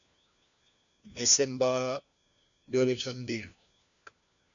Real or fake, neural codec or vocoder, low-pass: fake; codec, 16 kHz, 1 kbps, FunCodec, trained on LibriTTS, 50 frames a second; 7.2 kHz